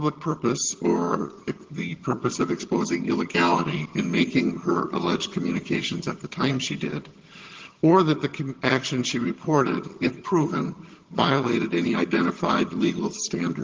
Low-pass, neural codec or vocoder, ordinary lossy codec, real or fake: 7.2 kHz; vocoder, 22.05 kHz, 80 mel bands, HiFi-GAN; Opus, 16 kbps; fake